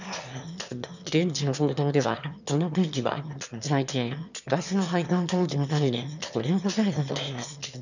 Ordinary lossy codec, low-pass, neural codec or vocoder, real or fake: none; 7.2 kHz; autoencoder, 22.05 kHz, a latent of 192 numbers a frame, VITS, trained on one speaker; fake